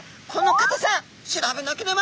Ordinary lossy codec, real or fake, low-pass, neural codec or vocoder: none; real; none; none